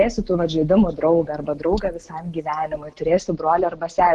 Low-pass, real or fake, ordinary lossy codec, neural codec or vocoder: 10.8 kHz; real; Opus, 16 kbps; none